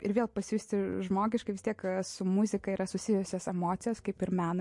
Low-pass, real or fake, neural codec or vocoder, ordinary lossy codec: 10.8 kHz; real; none; MP3, 48 kbps